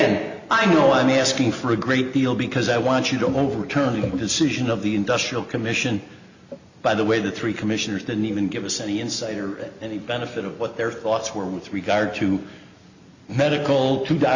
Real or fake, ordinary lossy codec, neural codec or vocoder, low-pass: real; Opus, 64 kbps; none; 7.2 kHz